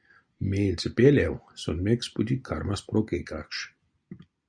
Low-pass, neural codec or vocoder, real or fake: 9.9 kHz; none; real